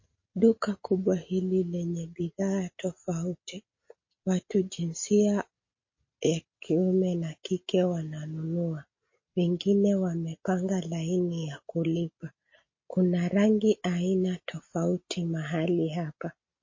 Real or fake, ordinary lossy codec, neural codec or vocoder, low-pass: real; MP3, 32 kbps; none; 7.2 kHz